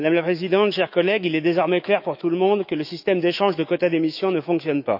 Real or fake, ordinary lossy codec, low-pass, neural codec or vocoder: fake; none; 5.4 kHz; autoencoder, 48 kHz, 128 numbers a frame, DAC-VAE, trained on Japanese speech